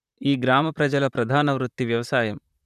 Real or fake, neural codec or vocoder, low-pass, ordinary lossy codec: fake; vocoder, 44.1 kHz, 128 mel bands, Pupu-Vocoder; 14.4 kHz; none